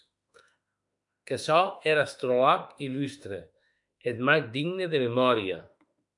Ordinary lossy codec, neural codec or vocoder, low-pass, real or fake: MP3, 96 kbps; autoencoder, 48 kHz, 32 numbers a frame, DAC-VAE, trained on Japanese speech; 10.8 kHz; fake